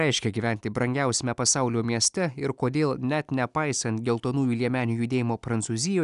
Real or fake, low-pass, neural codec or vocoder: real; 10.8 kHz; none